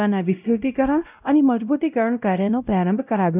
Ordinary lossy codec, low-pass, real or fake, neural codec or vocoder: none; 3.6 kHz; fake; codec, 16 kHz, 0.5 kbps, X-Codec, WavLM features, trained on Multilingual LibriSpeech